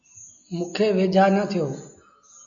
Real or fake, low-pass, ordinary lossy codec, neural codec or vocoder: real; 7.2 kHz; AAC, 64 kbps; none